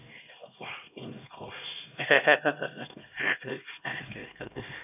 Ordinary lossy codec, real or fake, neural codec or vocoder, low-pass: none; fake; codec, 16 kHz, 1 kbps, X-Codec, HuBERT features, trained on LibriSpeech; 3.6 kHz